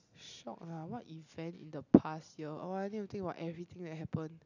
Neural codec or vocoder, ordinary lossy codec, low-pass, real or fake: none; none; 7.2 kHz; real